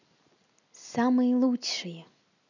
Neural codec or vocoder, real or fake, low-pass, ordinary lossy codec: none; real; 7.2 kHz; none